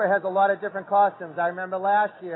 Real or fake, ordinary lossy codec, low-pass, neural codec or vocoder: real; AAC, 16 kbps; 7.2 kHz; none